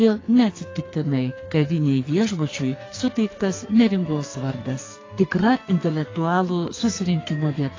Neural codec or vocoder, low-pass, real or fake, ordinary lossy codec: codec, 44.1 kHz, 2.6 kbps, SNAC; 7.2 kHz; fake; AAC, 32 kbps